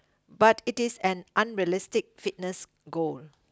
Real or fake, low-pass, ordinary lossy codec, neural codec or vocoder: real; none; none; none